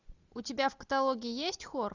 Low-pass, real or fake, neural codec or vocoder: 7.2 kHz; real; none